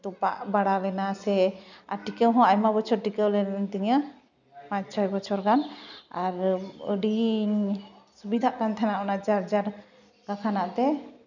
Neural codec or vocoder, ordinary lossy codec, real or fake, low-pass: none; none; real; 7.2 kHz